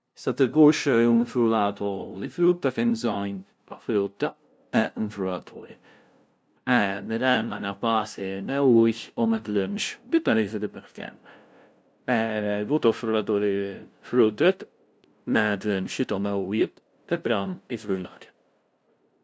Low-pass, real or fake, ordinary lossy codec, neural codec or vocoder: none; fake; none; codec, 16 kHz, 0.5 kbps, FunCodec, trained on LibriTTS, 25 frames a second